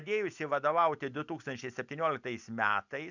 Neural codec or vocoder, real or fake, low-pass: none; real; 7.2 kHz